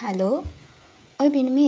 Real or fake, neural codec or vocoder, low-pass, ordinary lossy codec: fake; codec, 16 kHz, 16 kbps, FreqCodec, smaller model; none; none